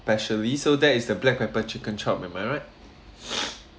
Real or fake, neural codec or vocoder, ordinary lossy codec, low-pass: real; none; none; none